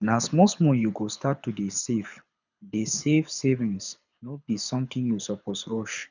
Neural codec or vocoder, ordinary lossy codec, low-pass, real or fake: codec, 24 kHz, 6 kbps, HILCodec; none; 7.2 kHz; fake